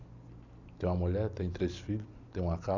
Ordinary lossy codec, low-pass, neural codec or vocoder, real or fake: none; 7.2 kHz; none; real